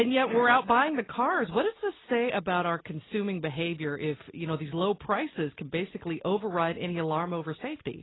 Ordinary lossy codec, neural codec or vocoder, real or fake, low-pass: AAC, 16 kbps; none; real; 7.2 kHz